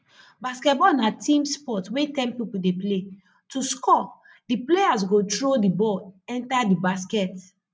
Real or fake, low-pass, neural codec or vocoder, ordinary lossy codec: real; none; none; none